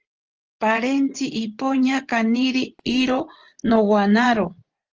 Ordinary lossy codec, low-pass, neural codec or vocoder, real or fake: Opus, 16 kbps; 7.2 kHz; vocoder, 24 kHz, 100 mel bands, Vocos; fake